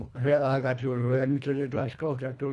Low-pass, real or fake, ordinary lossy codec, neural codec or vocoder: none; fake; none; codec, 24 kHz, 1.5 kbps, HILCodec